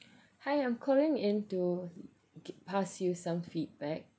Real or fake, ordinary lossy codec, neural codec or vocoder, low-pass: real; none; none; none